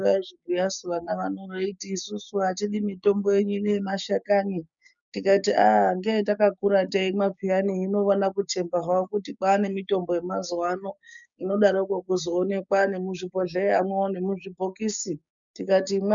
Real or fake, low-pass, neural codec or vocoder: fake; 7.2 kHz; codec, 16 kHz, 6 kbps, DAC